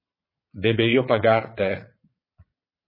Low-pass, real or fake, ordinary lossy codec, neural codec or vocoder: 5.4 kHz; fake; MP3, 24 kbps; vocoder, 22.05 kHz, 80 mel bands, Vocos